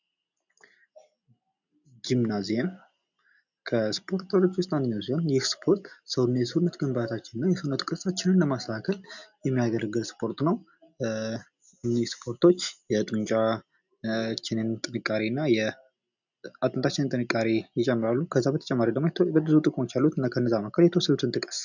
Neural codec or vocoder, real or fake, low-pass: none; real; 7.2 kHz